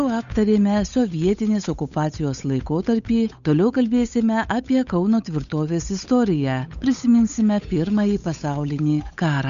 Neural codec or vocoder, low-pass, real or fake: codec, 16 kHz, 8 kbps, FunCodec, trained on Chinese and English, 25 frames a second; 7.2 kHz; fake